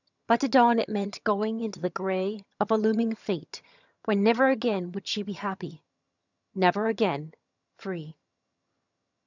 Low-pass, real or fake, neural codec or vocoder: 7.2 kHz; fake; vocoder, 22.05 kHz, 80 mel bands, HiFi-GAN